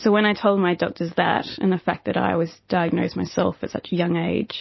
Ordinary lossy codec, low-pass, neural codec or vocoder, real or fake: MP3, 24 kbps; 7.2 kHz; none; real